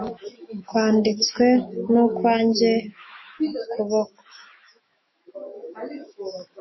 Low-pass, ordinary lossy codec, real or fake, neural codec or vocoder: 7.2 kHz; MP3, 24 kbps; fake; autoencoder, 48 kHz, 128 numbers a frame, DAC-VAE, trained on Japanese speech